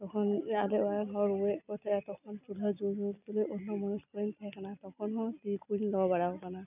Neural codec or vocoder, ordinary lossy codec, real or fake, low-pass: none; none; real; 3.6 kHz